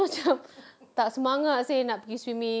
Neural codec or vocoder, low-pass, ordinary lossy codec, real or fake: none; none; none; real